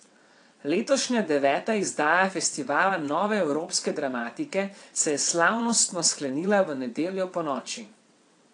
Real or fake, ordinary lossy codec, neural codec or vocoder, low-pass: fake; AAC, 48 kbps; vocoder, 22.05 kHz, 80 mel bands, WaveNeXt; 9.9 kHz